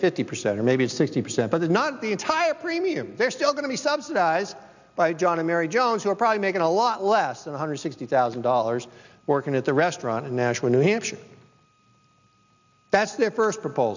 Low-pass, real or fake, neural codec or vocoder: 7.2 kHz; real; none